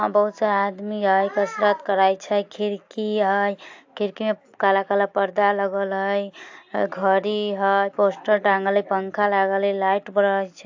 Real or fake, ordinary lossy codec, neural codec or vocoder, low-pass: real; AAC, 48 kbps; none; 7.2 kHz